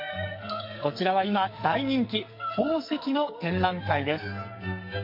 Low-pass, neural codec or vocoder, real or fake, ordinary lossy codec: 5.4 kHz; codec, 44.1 kHz, 2.6 kbps, SNAC; fake; MP3, 32 kbps